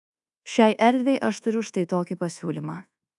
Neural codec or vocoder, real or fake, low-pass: codec, 24 kHz, 1.2 kbps, DualCodec; fake; 10.8 kHz